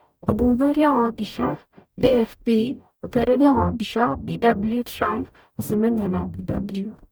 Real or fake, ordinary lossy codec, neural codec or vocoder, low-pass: fake; none; codec, 44.1 kHz, 0.9 kbps, DAC; none